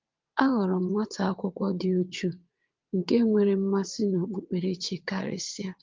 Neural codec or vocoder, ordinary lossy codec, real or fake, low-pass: vocoder, 22.05 kHz, 80 mel bands, WaveNeXt; Opus, 32 kbps; fake; 7.2 kHz